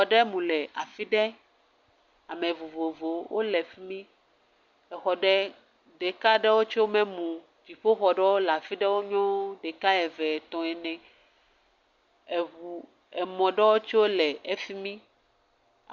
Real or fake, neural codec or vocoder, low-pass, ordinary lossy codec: real; none; 7.2 kHz; Opus, 64 kbps